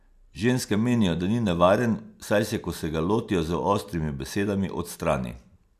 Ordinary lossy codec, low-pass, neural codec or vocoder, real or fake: none; 14.4 kHz; none; real